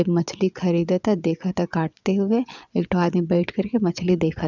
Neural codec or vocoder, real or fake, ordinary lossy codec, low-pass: vocoder, 22.05 kHz, 80 mel bands, WaveNeXt; fake; none; 7.2 kHz